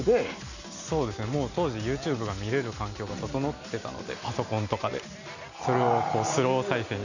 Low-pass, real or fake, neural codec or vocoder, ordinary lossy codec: 7.2 kHz; real; none; none